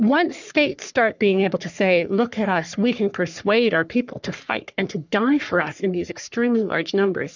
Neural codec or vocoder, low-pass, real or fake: codec, 44.1 kHz, 3.4 kbps, Pupu-Codec; 7.2 kHz; fake